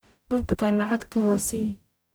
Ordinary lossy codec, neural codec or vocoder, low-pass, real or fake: none; codec, 44.1 kHz, 0.9 kbps, DAC; none; fake